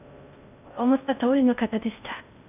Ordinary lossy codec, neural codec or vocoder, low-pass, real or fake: none; codec, 16 kHz in and 24 kHz out, 0.6 kbps, FocalCodec, streaming, 4096 codes; 3.6 kHz; fake